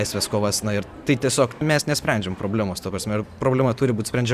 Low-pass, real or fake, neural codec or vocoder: 14.4 kHz; fake; vocoder, 44.1 kHz, 128 mel bands every 512 samples, BigVGAN v2